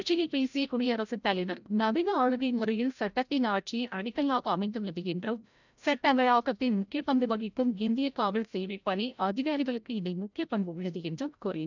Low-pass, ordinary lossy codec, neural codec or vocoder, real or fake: 7.2 kHz; none; codec, 16 kHz, 0.5 kbps, FreqCodec, larger model; fake